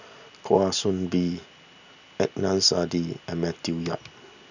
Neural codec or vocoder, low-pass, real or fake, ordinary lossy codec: none; 7.2 kHz; real; none